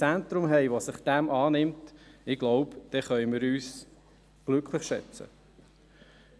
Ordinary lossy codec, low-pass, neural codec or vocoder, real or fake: none; none; none; real